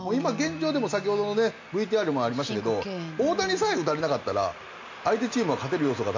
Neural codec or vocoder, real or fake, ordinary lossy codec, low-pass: none; real; MP3, 48 kbps; 7.2 kHz